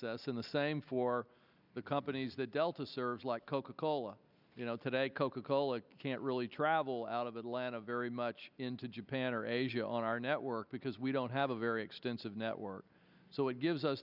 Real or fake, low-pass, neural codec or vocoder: real; 5.4 kHz; none